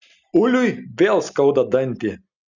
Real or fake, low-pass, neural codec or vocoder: real; 7.2 kHz; none